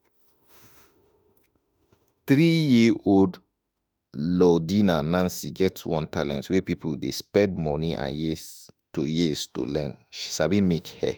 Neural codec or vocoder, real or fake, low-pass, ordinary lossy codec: autoencoder, 48 kHz, 32 numbers a frame, DAC-VAE, trained on Japanese speech; fake; none; none